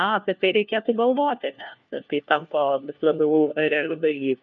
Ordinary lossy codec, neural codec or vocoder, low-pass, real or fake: MP3, 64 kbps; codec, 16 kHz, 1 kbps, FunCodec, trained on LibriTTS, 50 frames a second; 7.2 kHz; fake